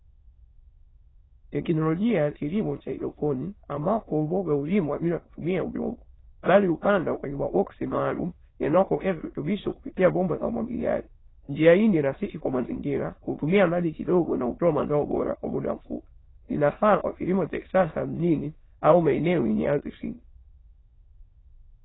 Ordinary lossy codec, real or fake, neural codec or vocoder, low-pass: AAC, 16 kbps; fake; autoencoder, 22.05 kHz, a latent of 192 numbers a frame, VITS, trained on many speakers; 7.2 kHz